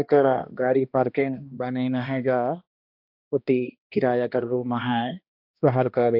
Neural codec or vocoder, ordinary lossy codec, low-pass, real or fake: codec, 16 kHz, 1 kbps, X-Codec, HuBERT features, trained on balanced general audio; AAC, 48 kbps; 5.4 kHz; fake